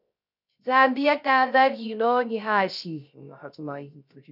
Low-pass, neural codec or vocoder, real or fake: 5.4 kHz; codec, 16 kHz, 0.3 kbps, FocalCodec; fake